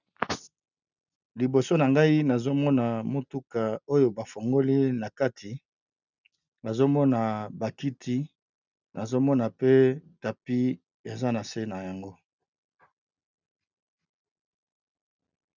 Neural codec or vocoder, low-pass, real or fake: none; 7.2 kHz; real